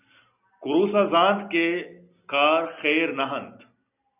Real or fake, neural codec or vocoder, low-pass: real; none; 3.6 kHz